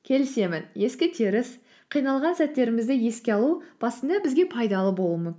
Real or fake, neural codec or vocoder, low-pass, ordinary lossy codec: real; none; none; none